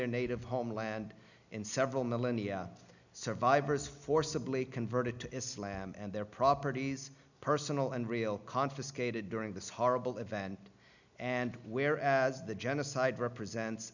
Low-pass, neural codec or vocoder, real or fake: 7.2 kHz; none; real